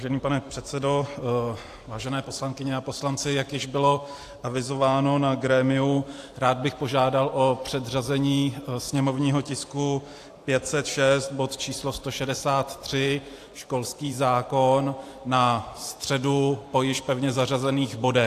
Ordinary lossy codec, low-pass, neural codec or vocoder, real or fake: AAC, 64 kbps; 14.4 kHz; vocoder, 44.1 kHz, 128 mel bands every 512 samples, BigVGAN v2; fake